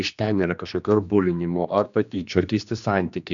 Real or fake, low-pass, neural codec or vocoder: fake; 7.2 kHz; codec, 16 kHz, 2 kbps, X-Codec, HuBERT features, trained on general audio